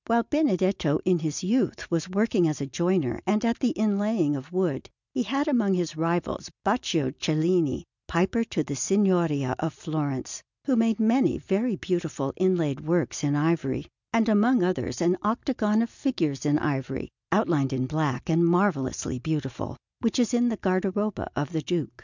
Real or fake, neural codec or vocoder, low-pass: real; none; 7.2 kHz